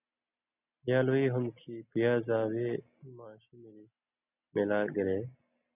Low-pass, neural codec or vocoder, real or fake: 3.6 kHz; none; real